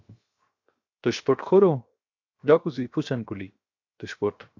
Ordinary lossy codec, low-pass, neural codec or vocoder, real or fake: AAC, 48 kbps; 7.2 kHz; codec, 16 kHz, 0.7 kbps, FocalCodec; fake